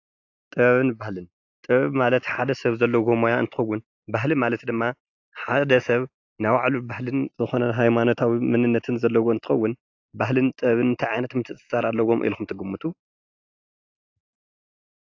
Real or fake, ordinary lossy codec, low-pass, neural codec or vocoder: real; AAC, 48 kbps; 7.2 kHz; none